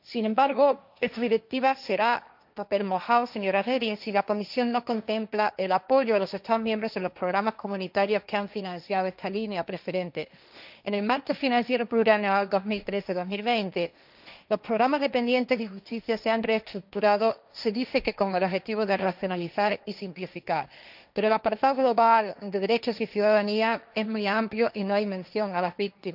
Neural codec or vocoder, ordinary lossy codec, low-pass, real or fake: codec, 16 kHz, 1.1 kbps, Voila-Tokenizer; none; 5.4 kHz; fake